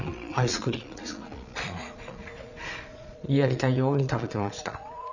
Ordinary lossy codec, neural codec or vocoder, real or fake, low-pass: none; vocoder, 22.05 kHz, 80 mel bands, Vocos; fake; 7.2 kHz